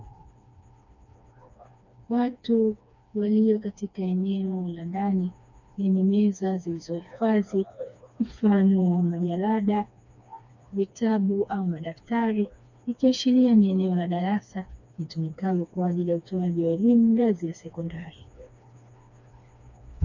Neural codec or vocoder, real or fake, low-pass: codec, 16 kHz, 2 kbps, FreqCodec, smaller model; fake; 7.2 kHz